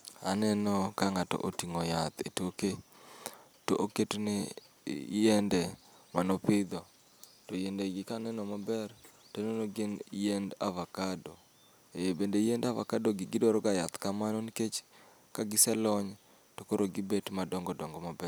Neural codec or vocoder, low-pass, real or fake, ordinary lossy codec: none; none; real; none